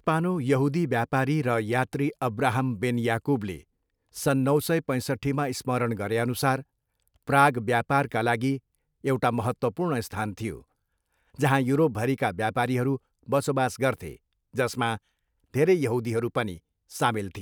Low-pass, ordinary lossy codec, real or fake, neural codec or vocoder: none; none; real; none